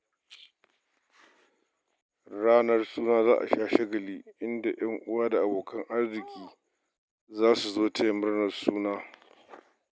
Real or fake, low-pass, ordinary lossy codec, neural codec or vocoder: real; none; none; none